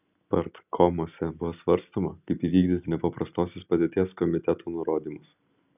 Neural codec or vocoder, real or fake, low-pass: none; real; 3.6 kHz